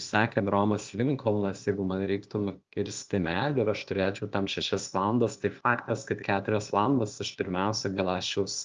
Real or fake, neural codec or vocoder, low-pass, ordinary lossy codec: fake; codec, 16 kHz, 0.8 kbps, ZipCodec; 7.2 kHz; Opus, 32 kbps